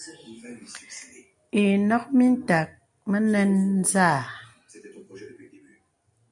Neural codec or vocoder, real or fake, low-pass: none; real; 10.8 kHz